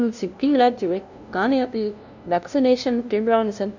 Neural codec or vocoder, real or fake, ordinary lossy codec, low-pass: codec, 16 kHz, 0.5 kbps, FunCodec, trained on LibriTTS, 25 frames a second; fake; none; 7.2 kHz